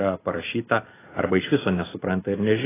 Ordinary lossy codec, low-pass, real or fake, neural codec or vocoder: AAC, 16 kbps; 3.6 kHz; real; none